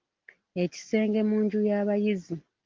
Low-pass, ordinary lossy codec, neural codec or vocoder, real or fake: 7.2 kHz; Opus, 32 kbps; none; real